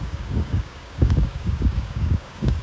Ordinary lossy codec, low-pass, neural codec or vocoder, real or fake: none; none; codec, 16 kHz, 6 kbps, DAC; fake